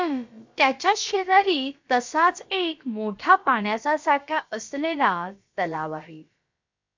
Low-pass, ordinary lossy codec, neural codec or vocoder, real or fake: 7.2 kHz; MP3, 64 kbps; codec, 16 kHz, about 1 kbps, DyCAST, with the encoder's durations; fake